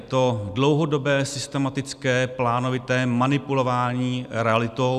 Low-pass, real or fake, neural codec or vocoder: 14.4 kHz; real; none